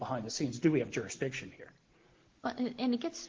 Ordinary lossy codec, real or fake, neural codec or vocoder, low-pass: Opus, 16 kbps; real; none; 7.2 kHz